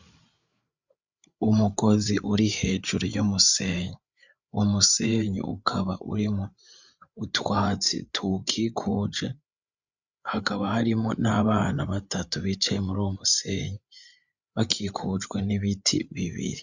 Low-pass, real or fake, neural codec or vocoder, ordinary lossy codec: 7.2 kHz; fake; codec, 16 kHz, 8 kbps, FreqCodec, larger model; Opus, 64 kbps